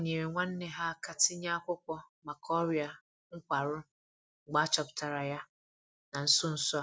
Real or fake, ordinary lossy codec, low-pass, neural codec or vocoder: real; none; none; none